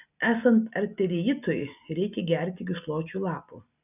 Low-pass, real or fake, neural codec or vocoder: 3.6 kHz; real; none